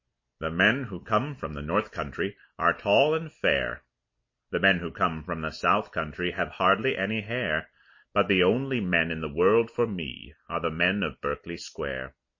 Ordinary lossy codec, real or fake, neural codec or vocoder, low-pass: MP3, 32 kbps; real; none; 7.2 kHz